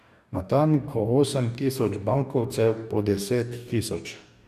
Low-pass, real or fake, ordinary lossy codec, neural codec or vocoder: 14.4 kHz; fake; none; codec, 44.1 kHz, 2.6 kbps, DAC